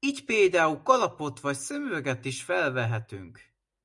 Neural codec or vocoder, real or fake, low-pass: none; real; 10.8 kHz